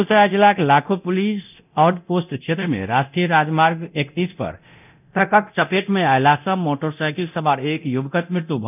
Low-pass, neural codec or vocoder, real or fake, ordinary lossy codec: 3.6 kHz; codec, 24 kHz, 0.9 kbps, DualCodec; fake; none